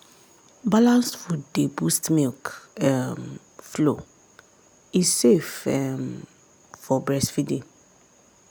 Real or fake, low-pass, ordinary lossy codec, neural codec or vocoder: real; none; none; none